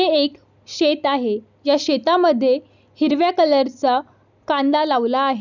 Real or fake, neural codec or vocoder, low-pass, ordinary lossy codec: real; none; 7.2 kHz; none